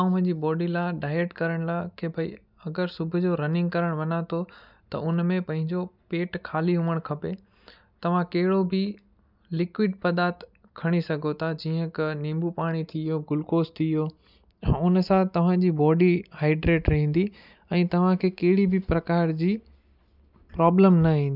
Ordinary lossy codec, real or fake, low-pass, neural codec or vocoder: none; real; 5.4 kHz; none